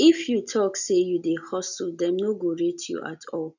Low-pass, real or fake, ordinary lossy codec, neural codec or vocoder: 7.2 kHz; real; none; none